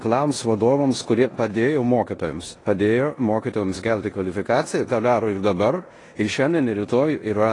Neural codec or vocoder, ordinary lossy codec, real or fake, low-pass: codec, 16 kHz in and 24 kHz out, 0.9 kbps, LongCat-Audio-Codec, four codebook decoder; AAC, 32 kbps; fake; 10.8 kHz